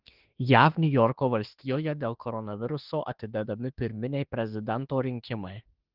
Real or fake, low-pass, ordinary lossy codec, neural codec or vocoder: fake; 5.4 kHz; Opus, 16 kbps; autoencoder, 48 kHz, 32 numbers a frame, DAC-VAE, trained on Japanese speech